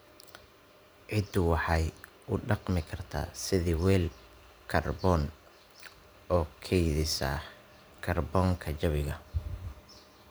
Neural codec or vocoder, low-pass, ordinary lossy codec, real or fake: vocoder, 44.1 kHz, 128 mel bands every 512 samples, BigVGAN v2; none; none; fake